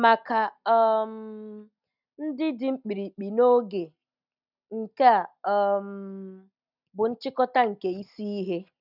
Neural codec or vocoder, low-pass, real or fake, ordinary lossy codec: none; 5.4 kHz; real; none